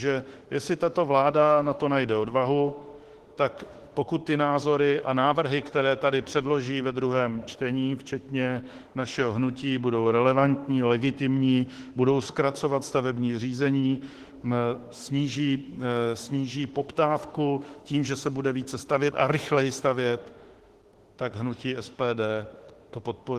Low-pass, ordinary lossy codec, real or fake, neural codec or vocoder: 14.4 kHz; Opus, 16 kbps; fake; autoencoder, 48 kHz, 32 numbers a frame, DAC-VAE, trained on Japanese speech